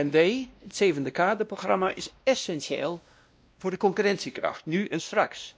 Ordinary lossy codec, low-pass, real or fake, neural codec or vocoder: none; none; fake; codec, 16 kHz, 1 kbps, X-Codec, WavLM features, trained on Multilingual LibriSpeech